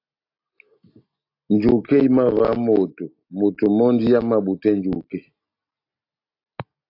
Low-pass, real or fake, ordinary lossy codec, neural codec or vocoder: 5.4 kHz; real; AAC, 32 kbps; none